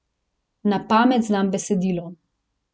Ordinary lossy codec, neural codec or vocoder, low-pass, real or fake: none; none; none; real